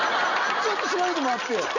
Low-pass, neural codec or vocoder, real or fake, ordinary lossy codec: 7.2 kHz; none; real; none